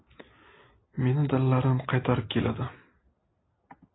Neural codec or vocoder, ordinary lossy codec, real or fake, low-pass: none; AAC, 16 kbps; real; 7.2 kHz